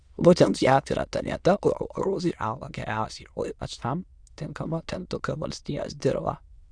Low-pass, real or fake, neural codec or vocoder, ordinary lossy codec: 9.9 kHz; fake; autoencoder, 22.05 kHz, a latent of 192 numbers a frame, VITS, trained on many speakers; Opus, 64 kbps